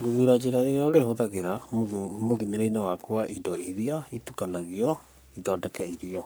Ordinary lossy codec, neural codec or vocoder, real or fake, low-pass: none; codec, 44.1 kHz, 3.4 kbps, Pupu-Codec; fake; none